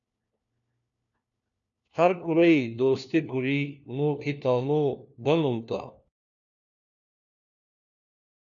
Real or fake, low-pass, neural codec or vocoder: fake; 7.2 kHz; codec, 16 kHz, 1 kbps, FunCodec, trained on LibriTTS, 50 frames a second